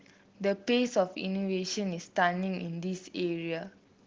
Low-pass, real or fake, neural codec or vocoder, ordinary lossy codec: 7.2 kHz; real; none; Opus, 16 kbps